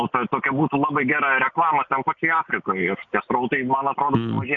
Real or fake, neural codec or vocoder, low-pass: real; none; 7.2 kHz